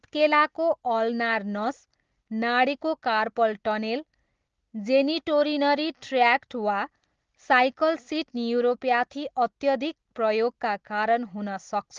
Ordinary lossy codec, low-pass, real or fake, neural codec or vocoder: Opus, 16 kbps; 7.2 kHz; real; none